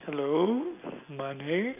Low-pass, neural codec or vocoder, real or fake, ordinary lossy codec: 3.6 kHz; none; real; none